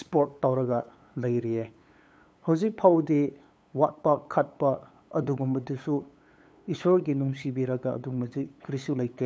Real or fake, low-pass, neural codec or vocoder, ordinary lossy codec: fake; none; codec, 16 kHz, 8 kbps, FunCodec, trained on LibriTTS, 25 frames a second; none